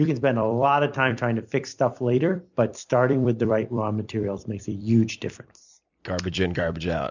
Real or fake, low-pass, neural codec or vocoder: fake; 7.2 kHz; vocoder, 44.1 kHz, 128 mel bands every 256 samples, BigVGAN v2